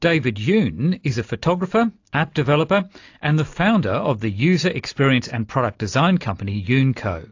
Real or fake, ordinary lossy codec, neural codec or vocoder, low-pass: real; AAC, 48 kbps; none; 7.2 kHz